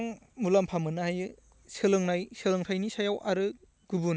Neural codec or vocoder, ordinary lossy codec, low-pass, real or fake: none; none; none; real